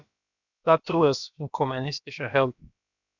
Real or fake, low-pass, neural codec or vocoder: fake; 7.2 kHz; codec, 16 kHz, about 1 kbps, DyCAST, with the encoder's durations